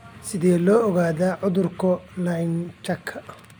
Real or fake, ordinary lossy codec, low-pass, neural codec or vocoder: real; none; none; none